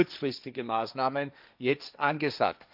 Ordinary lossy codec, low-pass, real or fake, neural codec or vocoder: none; 5.4 kHz; fake; codec, 16 kHz, 1.1 kbps, Voila-Tokenizer